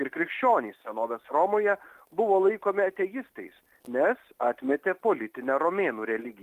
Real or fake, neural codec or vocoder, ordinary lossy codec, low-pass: real; none; Opus, 32 kbps; 14.4 kHz